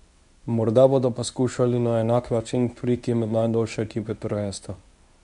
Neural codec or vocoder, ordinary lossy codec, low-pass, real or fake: codec, 24 kHz, 0.9 kbps, WavTokenizer, medium speech release version 1; none; 10.8 kHz; fake